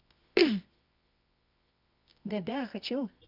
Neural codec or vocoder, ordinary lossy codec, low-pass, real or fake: codec, 24 kHz, 0.9 kbps, WavTokenizer, medium music audio release; none; 5.4 kHz; fake